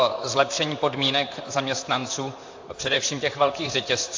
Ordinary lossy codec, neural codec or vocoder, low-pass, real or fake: AAC, 48 kbps; vocoder, 44.1 kHz, 128 mel bands, Pupu-Vocoder; 7.2 kHz; fake